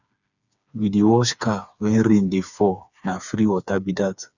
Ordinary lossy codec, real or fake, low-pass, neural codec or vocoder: none; fake; 7.2 kHz; codec, 16 kHz, 4 kbps, FreqCodec, smaller model